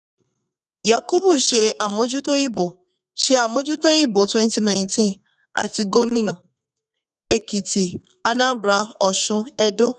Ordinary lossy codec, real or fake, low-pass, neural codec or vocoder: none; fake; 10.8 kHz; codec, 32 kHz, 1.9 kbps, SNAC